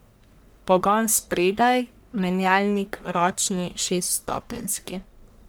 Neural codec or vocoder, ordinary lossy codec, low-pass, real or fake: codec, 44.1 kHz, 1.7 kbps, Pupu-Codec; none; none; fake